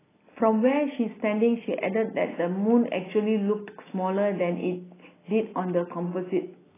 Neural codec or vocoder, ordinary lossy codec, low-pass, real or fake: none; AAC, 16 kbps; 3.6 kHz; real